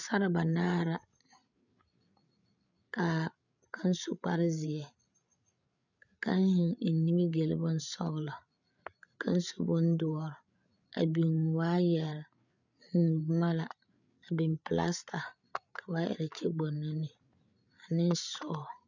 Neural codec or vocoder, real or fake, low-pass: codec, 16 kHz, 8 kbps, FreqCodec, larger model; fake; 7.2 kHz